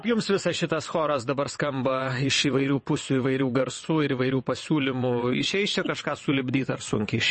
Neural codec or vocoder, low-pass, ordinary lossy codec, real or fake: vocoder, 22.05 kHz, 80 mel bands, WaveNeXt; 9.9 kHz; MP3, 32 kbps; fake